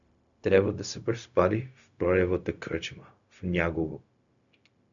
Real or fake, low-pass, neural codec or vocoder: fake; 7.2 kHz; codec, 16 kHz, 0.4 kbps, LongCat-Audio-Codec